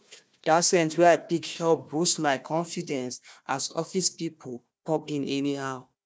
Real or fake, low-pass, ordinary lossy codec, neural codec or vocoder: fake; none; none; codec, 16 kHz, 1 kbps, FunCodec, trained on Chinese and English, 50 frames a second